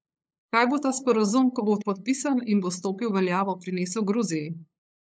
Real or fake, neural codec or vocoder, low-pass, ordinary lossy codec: fake; codec, 16 kHz, 8 kbps, FunCodec, trained on LibriTTS, 25 frames a second; none; none